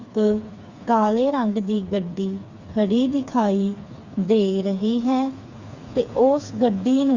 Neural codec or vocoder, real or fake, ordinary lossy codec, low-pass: codec, 16 kHz, 4 kbps, FreqCodec, smaller model; fake; Opus, 64 kbps; 7.2 kHz